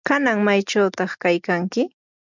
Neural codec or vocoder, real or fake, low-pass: none; real; 7.2 kHz